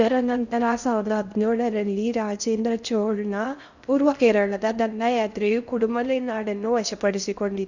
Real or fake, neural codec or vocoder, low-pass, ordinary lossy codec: fake; codec, 16 kHz in and 24 kHz out, 0.6 kbps, FocalCodec, streaming, 2048 codes; 7.2 kHz; none